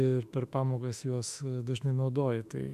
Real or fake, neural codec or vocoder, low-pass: fake; autoencoder, 48 kHz, 32 numbers a frame, DAC-VAE, trained on Japanese speech; 14.4 kHz